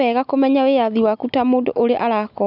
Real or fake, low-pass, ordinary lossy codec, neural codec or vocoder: real; 5.4 kHz; none; none